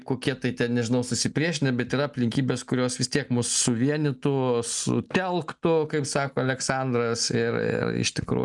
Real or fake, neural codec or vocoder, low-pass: real; none; 10.8 kHz